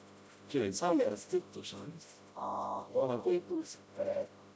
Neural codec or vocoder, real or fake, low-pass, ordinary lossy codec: codec, 16 kHz, 0.5 kbps, FreqCodec, smaller model; fake; none; none